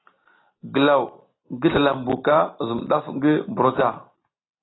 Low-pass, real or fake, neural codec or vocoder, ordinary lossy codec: 7.2 kHz; real; none; AAC, 16 kbps